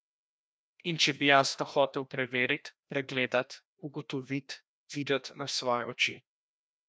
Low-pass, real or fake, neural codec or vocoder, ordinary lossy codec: none; fake; codec, 16 kHz, 1 kbps, FreqCodec, larger model; none